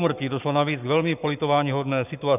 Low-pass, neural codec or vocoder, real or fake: 3.6 kHz; codec, 44.1 kHz, 7.8 kbps, Pupu-Codec; fake